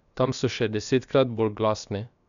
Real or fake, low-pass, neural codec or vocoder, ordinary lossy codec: fake; 7.2 kHz; codec, 16 kHz, 0.7 kbps, FocalCodec; none